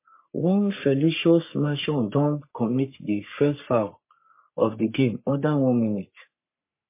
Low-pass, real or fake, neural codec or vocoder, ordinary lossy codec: 3.6 kHz; fake; codec, 44.1 kHz, 3.4 kbps, Pupu-Codec; MP3, 24 kbps